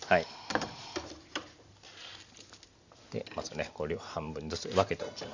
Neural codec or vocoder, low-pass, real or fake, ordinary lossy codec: none; 7.2 kHz; real; Opus, 64 kbps